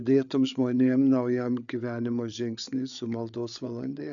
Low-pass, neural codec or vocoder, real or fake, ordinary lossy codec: 7.2 kHz; codec, 16 kHz, 8 kbps, FreqCodec, larger model; fake; MP3, 96 kbps